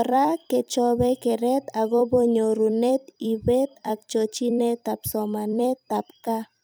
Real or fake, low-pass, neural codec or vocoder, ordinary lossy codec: fake; none; vocoder, 44.1 kHz, 128 mel bands every 256 samples, BigVGAN v2; none